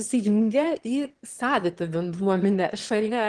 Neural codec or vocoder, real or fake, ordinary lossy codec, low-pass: autoencoder, 22.05 kHz, a latent of 192 numbers a frame, VITS, trained on one speaker; fake; Opus, 16 kbps; 9.9 kHz